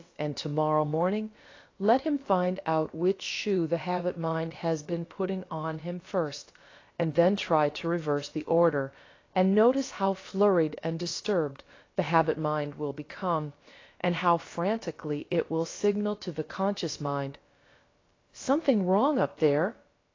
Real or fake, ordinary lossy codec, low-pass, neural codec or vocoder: fake; AAC, 32 kbps; 7.2 kHz; codec, 16 kHz, about 1 kbps, DyCAST, with the encoder's durations